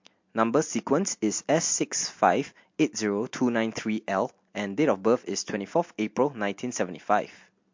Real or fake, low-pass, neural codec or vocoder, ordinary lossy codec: real; 7.2 kHz; none; MP3, 48 kbps